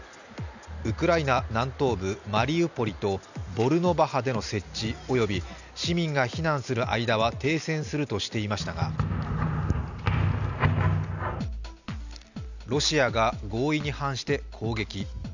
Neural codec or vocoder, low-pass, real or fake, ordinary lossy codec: none; 7.2 kHz; real; none